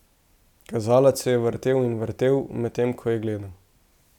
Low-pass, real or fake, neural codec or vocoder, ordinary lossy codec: 19.8 kHz; real; none; none